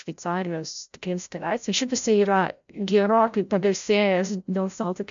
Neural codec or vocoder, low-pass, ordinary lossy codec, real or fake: codec, 16 kHz, 0.5 kbps, FreqCodec, larger model; 7.2 kHz; MP3, 64 kbps; fake